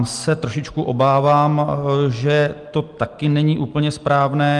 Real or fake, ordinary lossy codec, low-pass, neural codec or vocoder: real; Opus, 24 kbps; 10.8 kHz; none